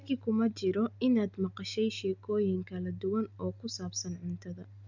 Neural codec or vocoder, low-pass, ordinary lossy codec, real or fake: none; 7.2 kHz; none; real